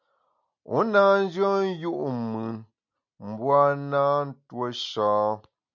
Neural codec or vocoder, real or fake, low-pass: none; real; 7.2 kHz